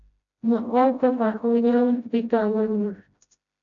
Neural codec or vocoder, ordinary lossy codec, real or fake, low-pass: codec, 16 kHz, 0.5 kbps, FreqCodec, smaller model; MP3, 64 kbps; fake; 7.2 kHz